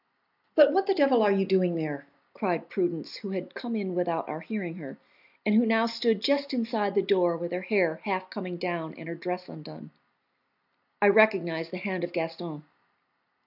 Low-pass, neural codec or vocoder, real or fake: 5.4 kHz; none; real